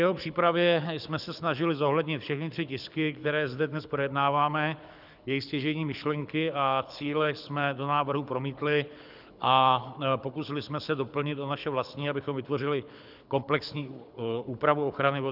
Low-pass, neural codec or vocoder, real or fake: 5.4 kHz; codec, 24 kHz, 6 kbps, HILCodec; fake